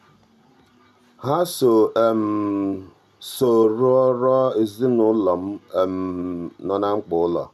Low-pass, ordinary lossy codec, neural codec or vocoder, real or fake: 14.4 kHz; none; none; real